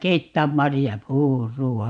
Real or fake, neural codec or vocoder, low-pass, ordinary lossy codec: fake; vocoder, 24 kHz, 100 mel bands, Vocos; 9.9 kHz; none